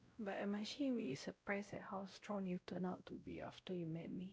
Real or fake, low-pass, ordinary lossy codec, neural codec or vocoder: fake; none; none; codec, 16 kHz, 0.5 kbps, X-Codec, WavLM features, trained on Multilingual LibriSpeech